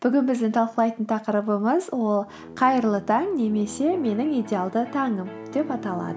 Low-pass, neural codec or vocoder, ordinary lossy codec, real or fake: none; none; none; real